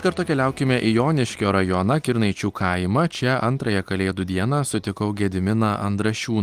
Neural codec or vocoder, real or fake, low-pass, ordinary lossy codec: none; real; 14.4 kHz; Opus, 24 kbps